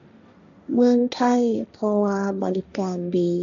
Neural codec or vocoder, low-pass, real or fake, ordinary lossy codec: codec, 16 kHz, 1.1 kbps, Voila-Tokenizer; 7.2 kHz; fake; none